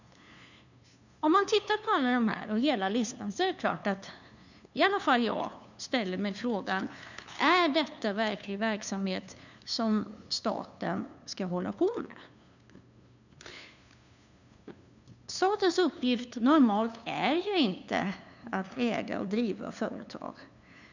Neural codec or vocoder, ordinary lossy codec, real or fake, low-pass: codec, 16 kHz, 2 kbps, FunCodec, trained on LibriTTS, 25 frames a second; none; fake; 7.2 kHz